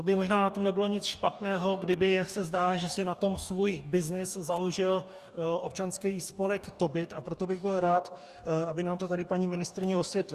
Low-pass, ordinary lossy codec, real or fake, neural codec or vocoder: 14.4 kHz; Opus, 64 kbps; fake; codec, 44.1 kHz, 2.6 kbps, DAC